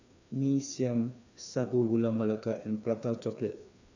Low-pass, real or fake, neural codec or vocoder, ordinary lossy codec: 7.2 kHz; fake; codec, 16 kHz, 2 kbps, FreqCodec, larger model; none